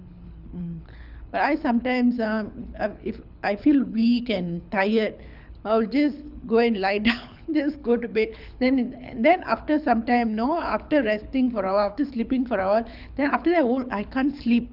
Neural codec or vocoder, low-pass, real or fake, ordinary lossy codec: codec, 24 kHz, 6 kbps, HILCodec; 5.4 kHz; fake; none